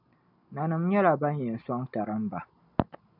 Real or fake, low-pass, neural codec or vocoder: real; 5.4 kHz; none